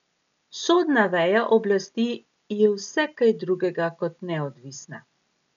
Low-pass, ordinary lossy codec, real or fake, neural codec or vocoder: 7.2 kHz; none; real; none